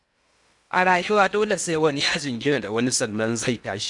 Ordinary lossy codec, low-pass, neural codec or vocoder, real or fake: none; 10.8 kHz; codec, 16 kHz in and 24 kHz out, 0.8 kbps, FocalCodec, streaming, 65536 codes; fake